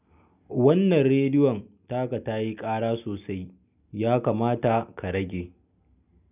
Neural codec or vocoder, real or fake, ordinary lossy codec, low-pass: none; real; none; 3.6 kHz